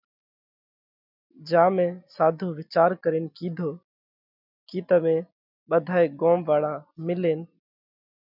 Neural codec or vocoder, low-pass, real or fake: none; 5.4 kHz; real